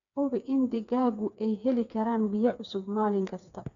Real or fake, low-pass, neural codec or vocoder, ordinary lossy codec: fake; 7.2 kHz; codec, 16 kHz, 4 kbps, FreqCodec, smaller model; none